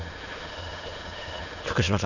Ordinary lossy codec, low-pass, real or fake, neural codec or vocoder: none; 7.2 kHz; fake; autoencoder, 22.05 kHz, a latent of 192 numbers a frame, VITS, trained on many speakers